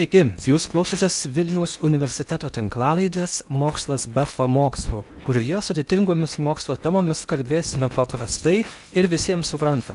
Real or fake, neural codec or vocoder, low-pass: fake; codec, 16 kHz in and 24 kHz out, 0.8 kbps, FocalCodec, streaming, 65536 codes; 10.8 kHz